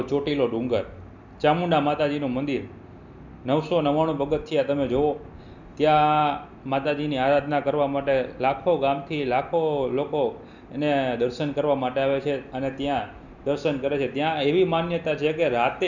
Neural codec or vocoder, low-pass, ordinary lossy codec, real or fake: none; 7.2 kHz; none; real